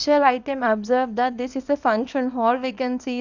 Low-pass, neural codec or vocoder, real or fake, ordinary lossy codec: 7.2 kHz; codec, 24 kHz, 0.9 kbps, WavTokenizer, small release; fake; none